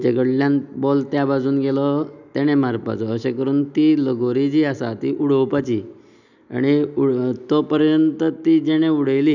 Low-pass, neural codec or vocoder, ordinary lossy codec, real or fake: 7.2 kHz; none; none; real